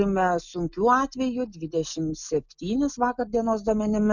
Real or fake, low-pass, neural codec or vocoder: real; 7.2 kHz; none